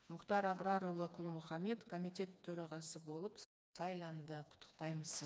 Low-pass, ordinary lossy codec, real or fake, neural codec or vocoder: none; none; fake; codec, 16 kHz, 2 kbps, FreqCodec, smaller model